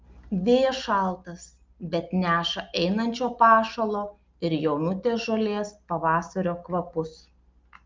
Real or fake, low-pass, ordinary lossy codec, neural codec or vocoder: real; 7.2 kHz; Opus, 32 kbps; none